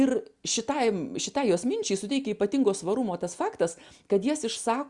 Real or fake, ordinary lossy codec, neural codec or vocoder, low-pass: real; Opus, 64 kbps; none; 10.8 kHz